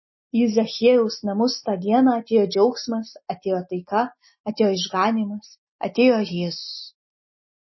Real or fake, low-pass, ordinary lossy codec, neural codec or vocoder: real; 7.2 kHz; MP3, 24 kbps; none